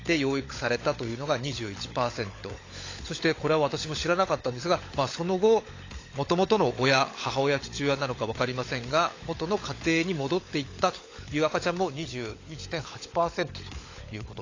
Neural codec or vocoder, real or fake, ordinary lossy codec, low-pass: codec, 16 kHz, 16 kbps, FunCodec, trained on LibriTTS, 50 frames a second; fake; AAC, 32 kbps; 7.2 kHz